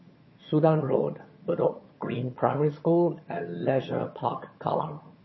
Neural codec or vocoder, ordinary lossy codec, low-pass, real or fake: vocoder, 22.05 kHz, 80 mel bands, HiFi-GAN; MP3, 24 kbps; 7.2 kHz; fake